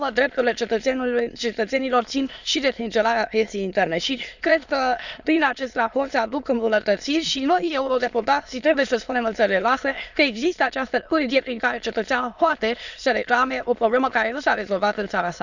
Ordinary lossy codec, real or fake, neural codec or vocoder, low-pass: none; fake; autoencoder, 22.05 kHz, a latent of 192 numbers a frame, VITS, trained on many speakers; 7.2 kHz